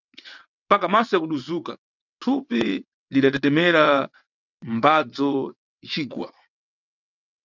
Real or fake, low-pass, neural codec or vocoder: fake; 7.2 kHz; vocoder, 22.05 kHz, 80 mel bands, WaveNeXt